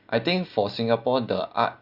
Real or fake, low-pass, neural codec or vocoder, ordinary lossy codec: real; 5.4 kHz; none; Opus, 64 kbps